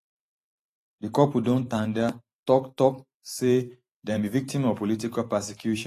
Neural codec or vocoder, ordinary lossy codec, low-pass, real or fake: vocoder, 44.1 kHz, 128 mel bands every 512 samples, BigVGAN v2; AAC, 48 kbps; 14.4 kHz; fake